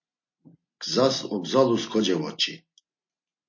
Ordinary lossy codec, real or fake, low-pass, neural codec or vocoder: MP3, 32 kbps; real; 7.2 kHz; none